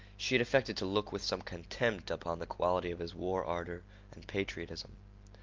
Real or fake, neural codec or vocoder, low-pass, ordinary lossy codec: real; none; 7.2 kHz; Opus, 24 kbps